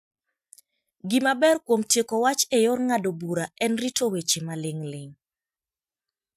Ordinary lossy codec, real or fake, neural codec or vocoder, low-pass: none; real; none; 14.4 kHz